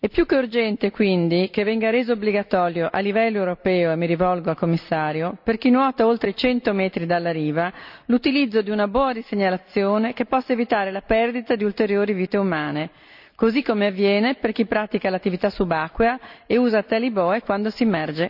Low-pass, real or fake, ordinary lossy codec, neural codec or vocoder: 5.4 kHz; real; none; none